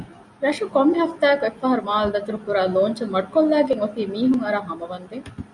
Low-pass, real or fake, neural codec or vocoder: 10.8 kHz; real; none